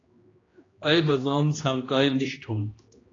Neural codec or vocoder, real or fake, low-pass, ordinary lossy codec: codec, 16 kHz, 1 kbps, X-Codec, HuBERT features, trained on general audio; fake; 7.2 kHz; AAC, 32 kbps